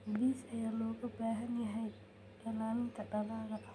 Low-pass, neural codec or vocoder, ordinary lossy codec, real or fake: none; none; none; real